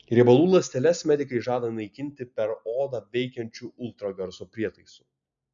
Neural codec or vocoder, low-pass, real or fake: none; 7.2 kHz; real